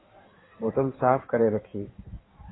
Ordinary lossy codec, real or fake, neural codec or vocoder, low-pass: AAC, 16 kbps; fake; codec, 16 kHz in and 24 kHz out, 1.1 kbps, FireRedTTS-2 codec; 7.2 kHz